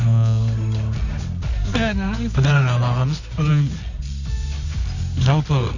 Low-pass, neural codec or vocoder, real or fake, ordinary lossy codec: 7.2 kHz; codec, 24 kHz, 0.9 kbps, WavTokenizer, medium music audio release; fake; none